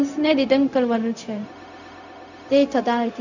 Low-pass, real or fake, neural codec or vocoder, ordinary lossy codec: 7.2 kHz; fake; codec, 16 kHz, 0.4 kbps, LongCat-Audio-Codec; none